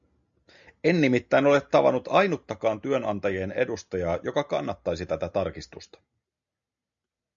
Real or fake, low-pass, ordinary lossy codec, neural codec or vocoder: real; 7.2 kHz; MP3, 96 kbps; none